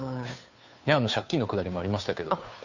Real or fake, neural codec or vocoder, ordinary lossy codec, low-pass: fake; codec, 16 kHz, 2 kbps, FunCodec, trained on Chinese and English, 25 frames a second; none; 7.2 kHz